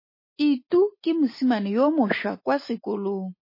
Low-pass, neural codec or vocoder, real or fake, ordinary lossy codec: 5.4 kHz; none; real; MP3, 24 kbps